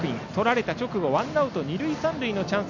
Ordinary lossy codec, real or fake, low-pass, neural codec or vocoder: none; real; 7.2 kHz; none